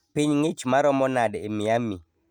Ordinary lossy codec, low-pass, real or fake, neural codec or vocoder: none; 19.8 kHz; real; none